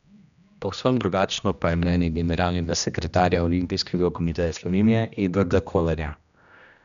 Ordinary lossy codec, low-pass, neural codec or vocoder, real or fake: none; 7.2 kHz; codec, 16 kHz, 1 kbps, X-Codec, HuBERT features, trained on general audio; fake